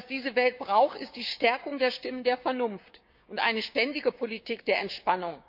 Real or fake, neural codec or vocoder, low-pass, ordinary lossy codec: fake; codec, 16 kHz, 6 kbps, DAC; 5.4 kHz; none